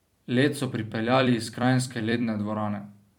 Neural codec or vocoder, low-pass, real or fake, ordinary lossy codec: vocoder, 44.1 kHz, 128 mel bands every 256 samples, BigVGAN v2; 19.8 kHz; fake; MP3, 96 kbps